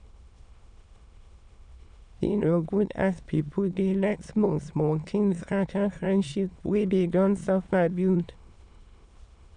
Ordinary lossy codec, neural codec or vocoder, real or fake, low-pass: none; autoencoder, 22.05 kHz, a latent of 192 numbers a frame, VITS, trained on many speakers; fake; 9.9 kHz